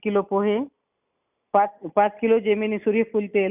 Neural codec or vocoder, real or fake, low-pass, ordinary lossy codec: none; real; 3.6 kHz; none